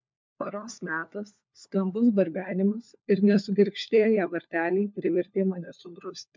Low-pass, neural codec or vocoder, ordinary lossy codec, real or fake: 7.2 kHz; codec, 16 kHz, 4 kbps, FunCodec, trained on LibriTTS, 50 frames a second; MP3, 64 kbps; fake